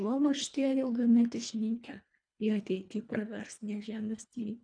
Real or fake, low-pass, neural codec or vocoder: fake; 9.9 kHz; codec, 24 kHz, 1.5 kbps, HILCodec